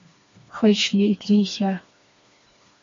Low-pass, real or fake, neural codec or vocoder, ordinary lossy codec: 7.2 kHz; fake; codec, 16 kHz, 2 kbps, FreqCodec, smaller model; MP3, 48 kbps